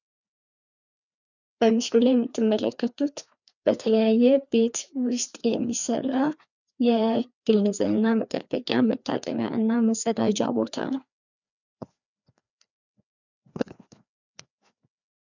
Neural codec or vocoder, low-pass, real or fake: codec, 16 kHz, 2 kbps, FreqCodec, larger model; 7.2 kHz; fake